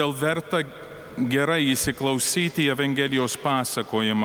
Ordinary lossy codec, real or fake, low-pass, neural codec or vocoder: Opus, 32 kbps; real; 19.8 kHz; none